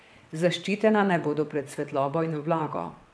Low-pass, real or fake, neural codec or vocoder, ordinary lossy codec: none; fake; vocoder, 22.05 kHz, 80 mel bands, WaveNeXt; none